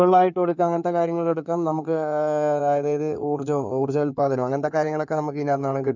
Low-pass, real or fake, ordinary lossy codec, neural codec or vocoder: 7.2 kHz; fake; none; codec, 16 kHz in and 24 kHz out, 2.2 kbps, FireRedTTS-2 codec